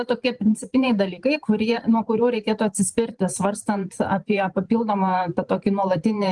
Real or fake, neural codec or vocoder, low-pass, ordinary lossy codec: fake; vocoder, 44.1 kHz, 128 mel bands every 512 samples, BigVGAN v2; 10.8 kHz; Opus, 24 kbps